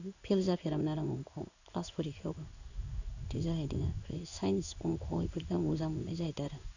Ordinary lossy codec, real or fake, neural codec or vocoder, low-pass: none; fake; codec, 16 kHz in and 24 kHz out, 1 kbps, XY-Tokenizer; 7.2 kHz